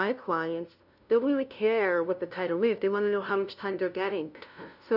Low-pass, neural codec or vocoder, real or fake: 5.4 kHz; codec, 16 kHz, 0.5 kbps, FunCodec, trained on LibriTTS, 25 frames a second; fake